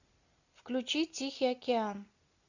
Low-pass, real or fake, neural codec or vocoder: 7.2 kHz; real; none